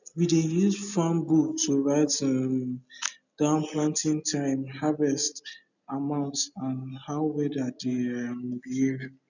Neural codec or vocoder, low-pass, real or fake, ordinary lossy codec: none; 7.2 kHz; real; none